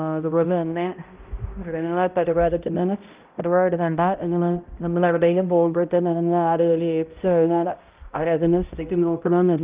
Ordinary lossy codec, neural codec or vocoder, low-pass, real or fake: Opus, 24 kbps; codec, 16 kHz, 0.5 kbps, X-Codec, HuBERT features, trained on balanced general audio; 3.6 kHz; fake